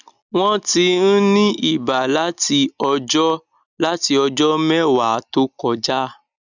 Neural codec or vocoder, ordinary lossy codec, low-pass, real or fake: none; none; 7.2 kHz; real